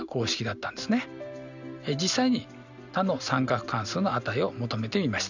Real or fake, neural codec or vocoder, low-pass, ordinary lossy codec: real; none; 7.2 kHz; none